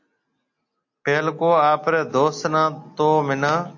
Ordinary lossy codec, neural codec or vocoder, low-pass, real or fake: AAC, 48 kbps; none; 7.2 kHz; real